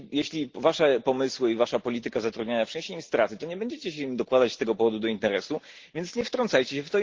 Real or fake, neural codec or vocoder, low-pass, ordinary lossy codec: real; none; 7.2 kHz; Opus, 16 kbps